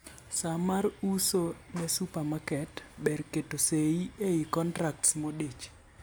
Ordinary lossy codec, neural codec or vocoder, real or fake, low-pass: none; none; real; none